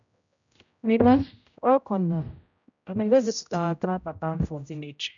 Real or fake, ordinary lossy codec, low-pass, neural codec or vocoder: fake; none; 7.2 kHz; codec, 16 kHz, 0.5 kbps, X-Codec, HuBERT features, trained on general audio